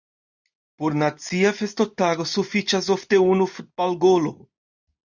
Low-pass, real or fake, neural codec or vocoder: 7.2 kHz; real; none